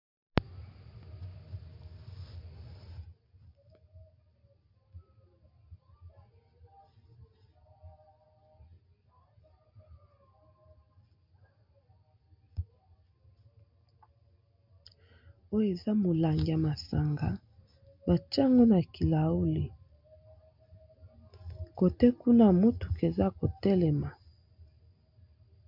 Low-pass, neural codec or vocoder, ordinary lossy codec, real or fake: 5.4 kHz; none; MP3, 48 kbps; real